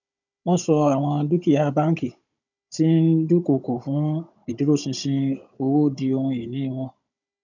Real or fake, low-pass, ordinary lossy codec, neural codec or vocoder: fake; 7.2 kHz; none; codec, 16 kHz, 16 kbps, FunCodec, trained on Chinese and English, 50 frames a second